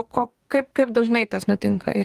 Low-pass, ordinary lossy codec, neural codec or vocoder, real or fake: 14.4 kHz; Opus, 32 kbps; codec, 44.1 kHz, 2.6 kbps, DAC; fake